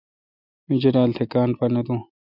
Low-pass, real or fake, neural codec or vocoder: 5.4 kHz; real; none